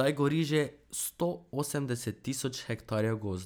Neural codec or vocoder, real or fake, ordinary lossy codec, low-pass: none; real; none; none